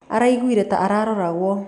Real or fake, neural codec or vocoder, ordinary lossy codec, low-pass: real; none; none; 10.8 kHz